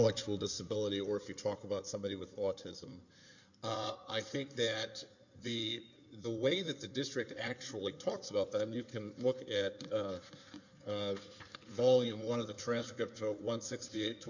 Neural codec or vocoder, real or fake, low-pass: codec, 16 kHz in and 24 kHz out, 2.2 kbps, FireRedTTS-2 codec; fake; 7.2 kHz